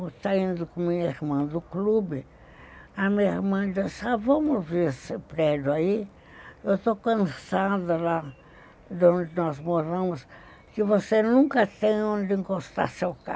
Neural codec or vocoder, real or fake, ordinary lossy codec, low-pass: none; real; none; none